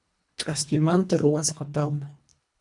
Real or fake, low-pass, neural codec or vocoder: fake; 10.8 kHz; codec, 24 kHz, 1.5 kbps, HILCodec